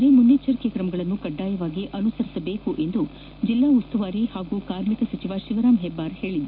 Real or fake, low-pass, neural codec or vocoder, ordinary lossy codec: real; 5.4 kHz; none; none